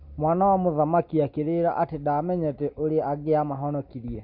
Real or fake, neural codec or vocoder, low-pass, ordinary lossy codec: real; none; 5.4 kHz; Opus, 64 kbps